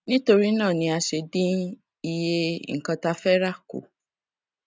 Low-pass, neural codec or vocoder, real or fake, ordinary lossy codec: none; none; real; none